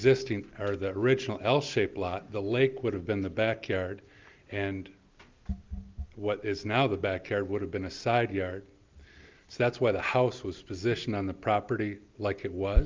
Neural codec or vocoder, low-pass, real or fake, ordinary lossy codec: none; 7.2 kHz; real; Opus, 24 kbps